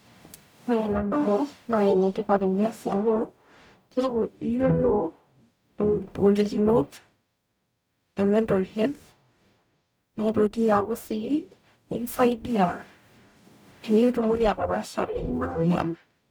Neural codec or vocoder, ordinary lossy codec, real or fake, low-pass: codec, 44.1 kHz, 0.9 kbps, DAC; none; fake; none